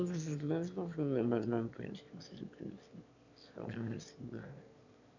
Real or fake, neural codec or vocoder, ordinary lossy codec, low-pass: fake; autoencoder, 22.05 kHz, a latent of 192 numbers a frame, VITS, trained on one speaker; none; 7.2 kHz